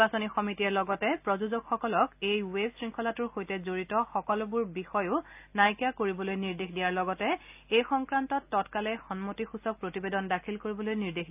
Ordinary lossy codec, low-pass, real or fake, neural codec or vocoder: AAC, 32 kbps; 3.6 kHz; real; none